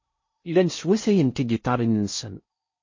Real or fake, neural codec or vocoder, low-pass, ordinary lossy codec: fake; codec, 16 kHz in and 24 kHz out, 0.6 kbps, FocalCodec, streaming, 2048 codes; 7.2 kHz; MP3, 32 kbps